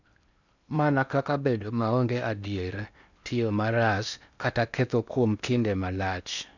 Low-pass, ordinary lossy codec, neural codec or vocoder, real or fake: 7.2 kHz; none; codec, 16 kHz in and 24 kHz out, 0.8 kbps, FocalCodec, streaming, 65536 codes; fake